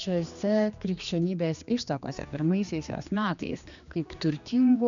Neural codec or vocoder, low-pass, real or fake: codec, 16 kHz, 2 kbps, X-Codec, HuBERT features, trained on general audio; 7.2 kHz; fake